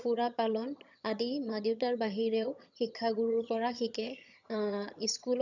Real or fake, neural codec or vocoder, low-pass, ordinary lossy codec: fake; vocoder, 22.05 kHz, 80 mel bands, HiFi-GAN; 7.2 kHz; none